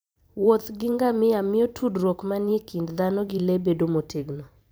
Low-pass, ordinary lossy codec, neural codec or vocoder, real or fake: none; none; vocoder, 44.1 kHz, 128 mel bands every 512 samples, BigVGAN v2; fake